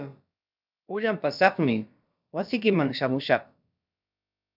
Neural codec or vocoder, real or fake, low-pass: codec, 16 kHz, about 1 kbps, DyCAST, with the encoder's durations; fake; 5.4 kHz